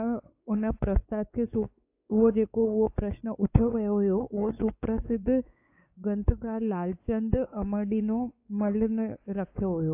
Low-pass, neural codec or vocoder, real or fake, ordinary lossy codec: 3.6 kHz; codec, 16 kHz, 4 kbps, X-Codec, WavLM features, trained on Multilingual LibriSpeech; fake; AAC, 24 kbps